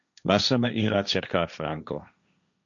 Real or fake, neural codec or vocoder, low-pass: fake; codec, 16 kHz, 1.1 kbps, Voila-Tokenizer; 7.2 kHz